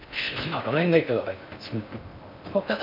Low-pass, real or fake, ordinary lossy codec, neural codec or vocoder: 5.4 kHz; fake; MP3, 48 kbps; codec, 16 kHz in and 24 kHz out, 0.6 kbps, FocalCodec, streaming, 4096 codes